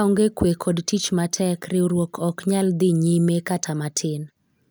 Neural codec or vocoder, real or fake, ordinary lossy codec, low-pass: none; real; none; none